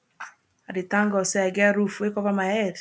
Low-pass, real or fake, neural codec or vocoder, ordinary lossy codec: none; real; none; none